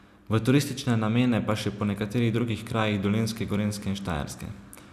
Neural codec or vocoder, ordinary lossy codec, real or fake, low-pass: none; none; real; 14.4 kHz